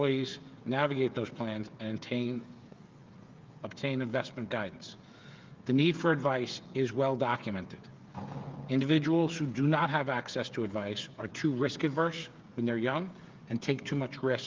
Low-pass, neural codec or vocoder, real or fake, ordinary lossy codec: 7.2 kHz; codec, 16 kHz, 8 kbps, FreqCodec, smaller model; fake; Opus, 32 kbps